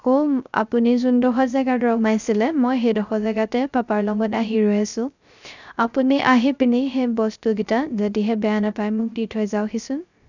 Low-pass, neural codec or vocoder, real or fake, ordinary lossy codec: 7.2 kHz; codec, 16 kHz, 0.3 kbps, FocalCodec; fake; none